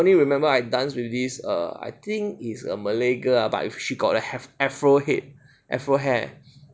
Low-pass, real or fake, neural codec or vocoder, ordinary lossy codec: none; real; none; none